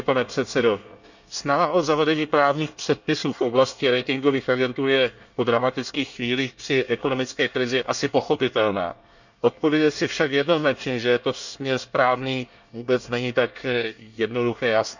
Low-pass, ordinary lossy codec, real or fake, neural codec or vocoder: 7.2 kHz; none; fake; codec, 24 kHz, 1 kbps, SNAC